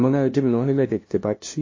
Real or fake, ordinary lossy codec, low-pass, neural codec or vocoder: fake; MP3, 32 kbps; 7.2 kHz; codec, 16 kHz, 0.5 kbps, FunCodec, trained on LibriTTS, 25 frames a second